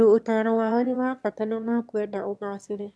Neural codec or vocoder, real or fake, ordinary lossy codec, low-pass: autoencoder, 22.05 kHz, a latent of 192 numbers a frame, VITS, trained on one speaker; fake; none; none